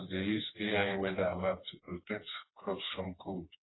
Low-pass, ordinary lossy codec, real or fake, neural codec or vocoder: 7.2 kHz; AAC, 16 kbps; fake; codec, 16 kHz, 2 kbps, FreqCodec, smaller model